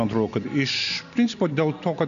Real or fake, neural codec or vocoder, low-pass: real; none; 7.2 kHz